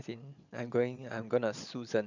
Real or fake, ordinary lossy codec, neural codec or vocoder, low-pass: fake; none; vocoder, 22.05 kHz, 80 mel bands, Vocos; 7.2 kHz